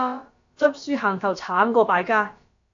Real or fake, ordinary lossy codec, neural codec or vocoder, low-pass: fake; AAC, 48 kbps; codec, 16 kHz, about 1 kbps, DyCAST, with the encoder's durations; 7.2 kHz